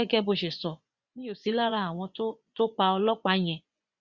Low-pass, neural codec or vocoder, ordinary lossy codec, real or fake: 7.2 kHz; vocoder, 24 kHz, 100 mel bands, Vocos; Opus, 64 kbps; fake